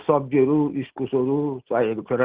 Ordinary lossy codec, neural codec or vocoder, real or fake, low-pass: Opus, 16 kbps; none; real; 3.6 kHz